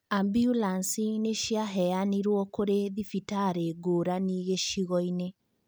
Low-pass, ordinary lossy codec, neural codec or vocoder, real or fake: none; none; none; real